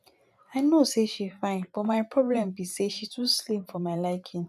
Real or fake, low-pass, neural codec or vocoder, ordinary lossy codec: fake; 14.4 kHz; vocoder, 44.1 kHz, 128 mel bands every 512 samples, BigVGAN v2; none